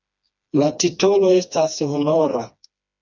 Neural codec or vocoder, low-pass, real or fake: codec, 16 kHz, 2 kbps, FreqCodec, smaller model; 7.2 kHz; fake